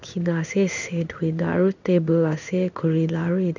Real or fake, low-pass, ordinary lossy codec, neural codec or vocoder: fake; 7.2 kHz; none; codec, 16 kHz in and 24 kHz out, 1 kbps, XY-Tokenizer